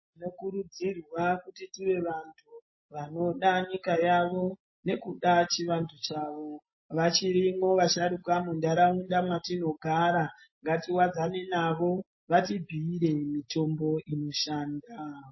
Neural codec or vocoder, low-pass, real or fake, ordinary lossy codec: none; 7.2 kHz; real; MP3, 24 kbps